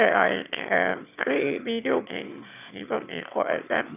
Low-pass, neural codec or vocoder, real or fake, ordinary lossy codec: 3.6 kHz; autoencoder, 22.05 kHz, a latent of 192 numbers a frame, VITS, trained on one speaker; fake; none